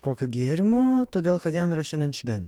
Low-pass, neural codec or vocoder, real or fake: 19.8 kHz; codec, 44.1 kHz, 2.6 kbps, DAC; fake